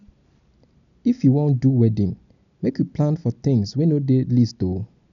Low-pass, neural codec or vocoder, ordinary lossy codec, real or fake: 7.2 kHz; none; none; real